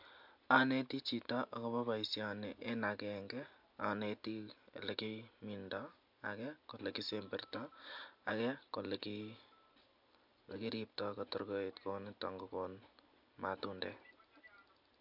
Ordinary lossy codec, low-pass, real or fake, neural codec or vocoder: MP3, 48 kbps; 5.4 kHz; real; none